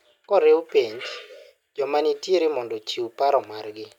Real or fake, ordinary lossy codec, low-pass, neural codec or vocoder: real; none; 19.8 kHz; none